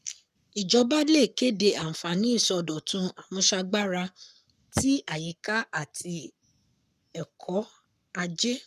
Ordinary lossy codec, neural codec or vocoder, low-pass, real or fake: none; codec, 44.1 kHz, 7.8 kbps, Pupu-Codec; 14.4 kHz; fake